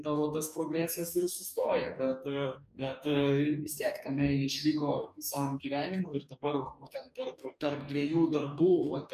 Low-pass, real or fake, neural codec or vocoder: 14.4 kHz; fake; codec, 44.1 kHz, 2.6 kbps, DAC